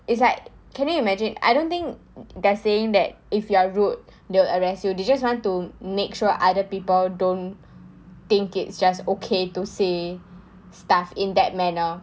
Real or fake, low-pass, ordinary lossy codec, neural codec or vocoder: real; none; none; none